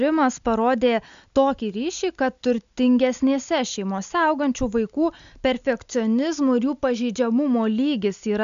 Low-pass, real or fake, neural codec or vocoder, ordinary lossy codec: 7.2 kHz; real; none; AAC, 96 kbps